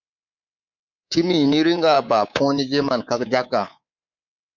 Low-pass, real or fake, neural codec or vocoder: 7.2 kHz; fake; codec, 24 kHz, 3.1 kbps, DualCodec